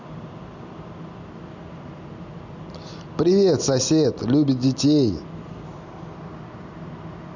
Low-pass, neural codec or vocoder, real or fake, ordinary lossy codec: 7.2 kHz; none; real; none